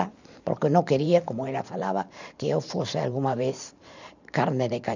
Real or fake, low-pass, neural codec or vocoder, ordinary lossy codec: fake; 7.2 kHz; vocoder, 44.1 kHz, 128 mel bands every 512 samples, BigVGAN v2; none